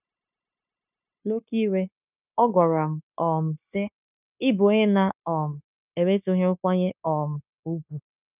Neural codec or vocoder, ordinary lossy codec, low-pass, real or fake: codec, 16 kHz, 0.9 kbps, LongCat-Audio-Codec; none; 3.6 kHz; fake